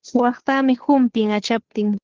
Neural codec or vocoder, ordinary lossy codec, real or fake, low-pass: codec, 16 kHz, 2 kbps, FunCodec, trained on Chinese and English, 25 frames a second; Opus, 16 kbps; fake; 7.2 kHz